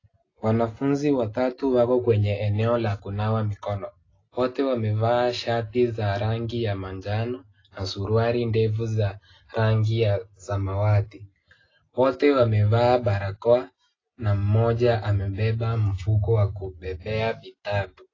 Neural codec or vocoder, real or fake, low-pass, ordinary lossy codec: none; real; 7.2 kHz; AAC, 32 kbps